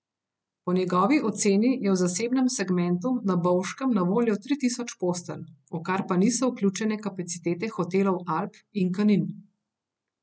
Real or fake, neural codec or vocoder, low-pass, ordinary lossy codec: real; none; none; none